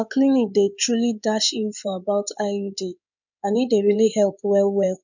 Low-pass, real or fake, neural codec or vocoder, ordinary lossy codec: 7.2 kHz; fake; codec, 16 kHz in and 24 kHz out, 2.2 kbps, FireRedTTS-2 codec; none